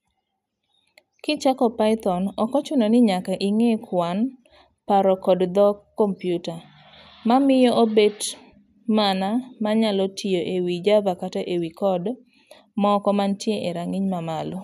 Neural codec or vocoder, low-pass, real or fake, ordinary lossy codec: none; 14.4 kHz; real; none